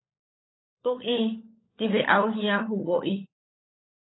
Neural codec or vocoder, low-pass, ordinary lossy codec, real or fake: codec, 16 kHz, 16 kbps, FunCodec, trained on LibriTTS, 50 frames a second; 7.2 kHz; AAC, 16 kbps; fake